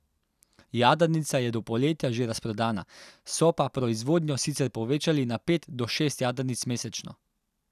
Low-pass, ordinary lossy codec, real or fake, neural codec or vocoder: 14.4 kHz; none; real; none